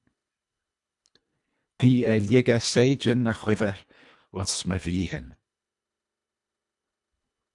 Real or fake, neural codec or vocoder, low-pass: fake; codec, 24 kHz, 1.5 kbps, HILCodec; 10.8 kHz